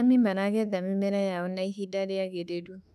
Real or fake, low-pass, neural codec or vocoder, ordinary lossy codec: fake; 14.4 kHz; autoencoder, 48 kHz, 32 numbers a frame, DAC-VAE, trained on Japanese speech; none